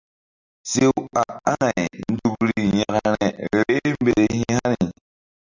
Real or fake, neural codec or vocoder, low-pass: real; none; 7.2 kHz